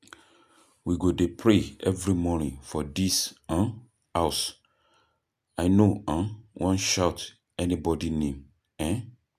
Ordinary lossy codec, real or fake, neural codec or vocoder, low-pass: MP3, 96 kbps; real; none; 14.4 kHz